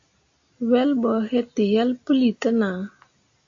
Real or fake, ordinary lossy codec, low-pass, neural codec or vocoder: real; AAC, 64 kbps; 7.2 kHz; none